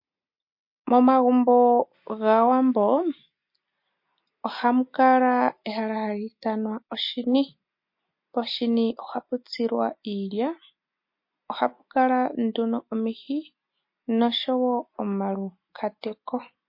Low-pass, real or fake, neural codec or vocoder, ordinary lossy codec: 5.4 kHz; real; none; MP3, 32 kbps